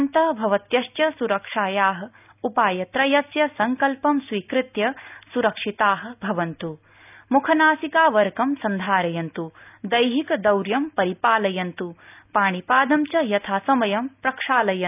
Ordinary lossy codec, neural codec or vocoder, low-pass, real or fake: none; none; 3.6 kHz; real